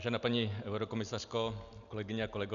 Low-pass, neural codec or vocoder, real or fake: 7.2 kHz; none; real